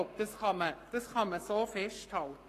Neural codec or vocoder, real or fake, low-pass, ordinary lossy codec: codec, 44.1 kHz, 7.8 kbps, Pupu-Codec; fake; 14.4 kHz; AAC, 48 kbps